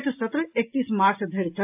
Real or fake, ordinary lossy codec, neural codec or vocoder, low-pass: real; AAC, 32 kbps; none; 3.6 kHz